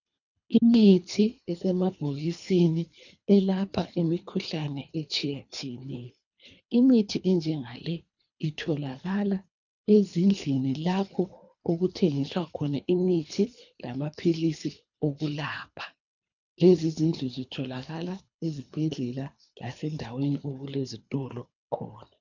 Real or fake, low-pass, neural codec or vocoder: fake; 7.2 kHz; codec, 24 kHz, 3 kbps, HILCodec